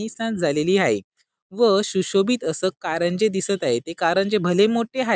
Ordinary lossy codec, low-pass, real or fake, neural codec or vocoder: none; none; real; none